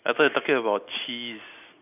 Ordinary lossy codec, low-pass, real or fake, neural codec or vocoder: none; 3.6 kHz; real; none